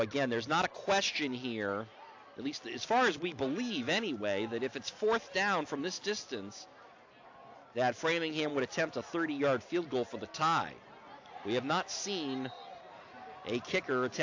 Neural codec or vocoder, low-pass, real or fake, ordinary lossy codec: none; 7.2 kHz; real; AAC, 48 kbps